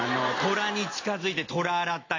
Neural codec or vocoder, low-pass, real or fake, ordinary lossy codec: none; 7.2 kHz; real; AAC, 32 kbps